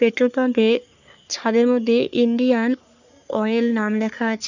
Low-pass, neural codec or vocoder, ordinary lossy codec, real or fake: 7.2 kHz; codec, 44.1 kHz, 3.4 kbps, Pupu-Codec; none; fake